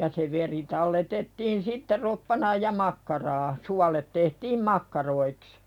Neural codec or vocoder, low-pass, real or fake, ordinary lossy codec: none; 19.8 kHz; real; none